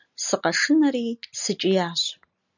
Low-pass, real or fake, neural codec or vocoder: 7.2 kHz; real; none